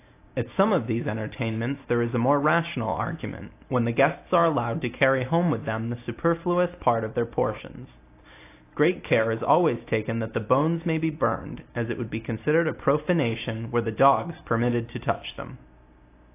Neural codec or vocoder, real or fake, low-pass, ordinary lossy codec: none; real; 3.6 kHz; AAC, 24 kbps